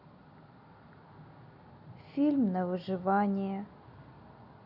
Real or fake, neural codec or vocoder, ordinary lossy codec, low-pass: real; none; none; 5.4 kHz